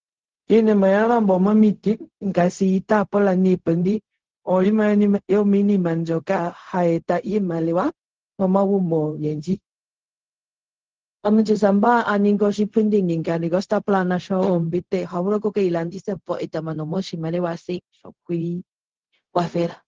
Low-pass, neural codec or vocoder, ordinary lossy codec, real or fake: 7.2 kHz; codec, 16 kHz, 0.4 kbps, LongCat-Audio-Codec; Opus, 16 kbps; fake